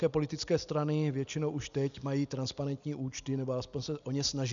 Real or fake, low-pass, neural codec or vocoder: real; 7.2 kHz; none